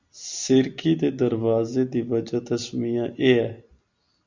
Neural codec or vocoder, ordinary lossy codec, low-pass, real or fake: none; Opus, 64 kbps; 7.2 kHz; real